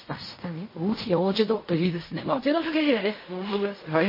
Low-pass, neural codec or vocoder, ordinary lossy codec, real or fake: 5.4 kHz; codec, 16 kHz in and 24 kHz out, 0.4 kbps, LongCat-Audio-Codec, fine tuned four codebook decoder; MP3, 24 kbps; fake